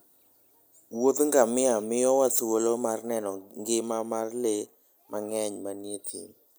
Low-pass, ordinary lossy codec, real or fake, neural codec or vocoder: none; none; real; none